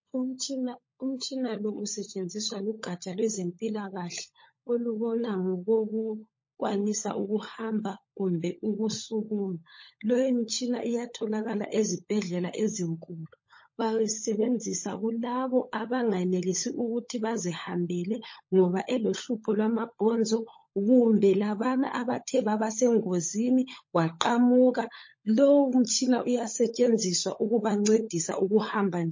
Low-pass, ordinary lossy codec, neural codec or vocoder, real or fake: 7.2 kHz; MP3, 32 kbps; codec, 16 kHz, 16 kbps, FunCodec, trained on LibriTTS, 50 frames a second; fake